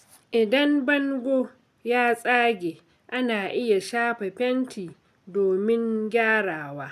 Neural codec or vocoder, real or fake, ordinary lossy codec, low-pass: none; real; none; 14.4 kHz